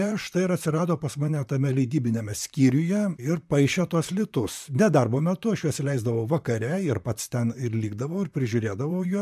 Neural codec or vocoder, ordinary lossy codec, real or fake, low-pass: vocoder, 44.1 kHz, 128 mel bands, Pupu-Vocoder; MP3, 96 kbps; fake; 14.4 kHz